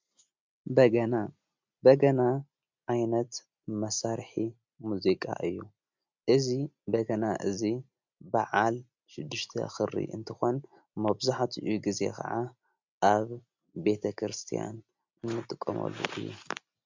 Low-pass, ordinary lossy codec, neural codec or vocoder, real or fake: 7.2 kHz; MP3, 64 kbps; none; real